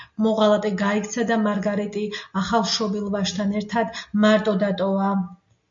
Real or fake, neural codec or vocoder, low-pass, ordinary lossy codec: real; none; 7.2 kHz; MP3, 48 kbps